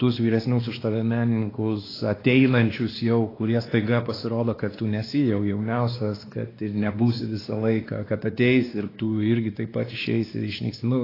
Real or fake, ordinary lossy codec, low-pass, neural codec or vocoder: fake; AAC, 24 kbps; 5.4 kHz; codec, 16 kHz, 2 kbps, X-Codec, WavLM features, trained on Multilingual LibriSpeech